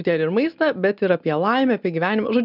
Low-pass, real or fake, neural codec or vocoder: 5.4 kHz; real; none